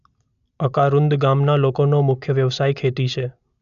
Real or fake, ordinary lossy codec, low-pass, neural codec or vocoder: real; none; 7.2 kHz; none